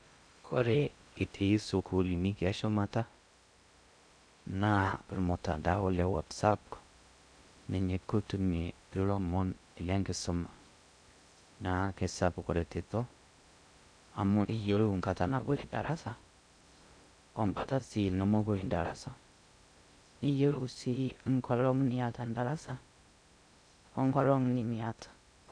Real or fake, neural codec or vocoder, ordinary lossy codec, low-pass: fake; codec, 16 kHz in and 24 kHz out, 0.6 kbps, FocalCodec, streaming, 2048 codes; none; 9.9 kHz